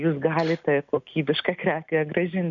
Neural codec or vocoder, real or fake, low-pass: none; real; 7.2 kHz